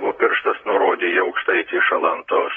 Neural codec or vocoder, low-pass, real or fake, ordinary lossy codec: vocoder, 44.1 kHz, 128 mel bands, Pupu-Vocoder; 19.8 kHz; fake; AAC, 24 kbps